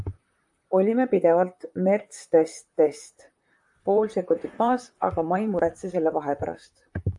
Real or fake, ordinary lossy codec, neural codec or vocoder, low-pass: fake; AAC, 64 kbps; vocoder, 44.1 kHz, 128 mel bands, Pupu-Vocoder; 10.8 kHz